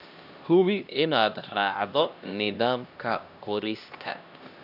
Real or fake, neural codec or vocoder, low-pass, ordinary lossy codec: fake; codec, 16 kHz, 1 kbps, X-Codec, HuBERT features, trained on LibriSpeech; 5.4 kHz; none